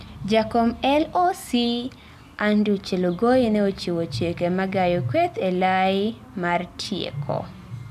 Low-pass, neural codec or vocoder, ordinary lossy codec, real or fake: 14.4 kHz; none; none; real